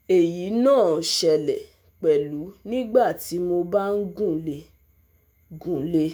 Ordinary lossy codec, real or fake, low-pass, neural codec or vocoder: none; fake; none; autoencoder, 48 kHz, 128 numbers a frame, DAC-VAE, trained on Japanese speech